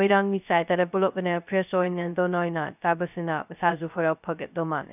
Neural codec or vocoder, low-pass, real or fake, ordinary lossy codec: codec, 16 kHz, 0.2 kbps, FocalCodec; 3.6 kHz; fake; none